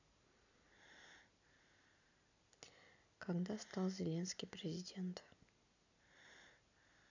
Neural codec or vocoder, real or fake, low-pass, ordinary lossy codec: none; real; 7.2 kHz; none